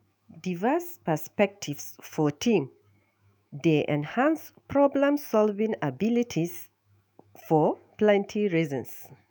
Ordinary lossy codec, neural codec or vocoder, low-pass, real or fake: none; autoencoder, 48 kHz, 128 numbers a frame, DAC-VAE, trained on Japanese speech; none; fake